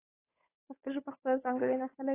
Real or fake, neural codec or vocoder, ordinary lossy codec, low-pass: fake; codec, 16 kHz, 8 kbps, FunCodec, trained on Chinese and English, 25 frames a second; AAC, 24 kbps; 3.6 kHz